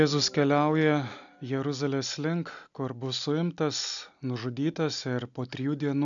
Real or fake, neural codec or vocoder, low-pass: real; none; 7.2 kHz